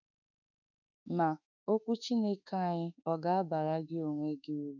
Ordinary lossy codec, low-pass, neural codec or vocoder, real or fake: none; 7.2 kHz; autoencoder, 48 kHz, 32 numbers a frame, DAC-VAE, trained on Japanese speech; fake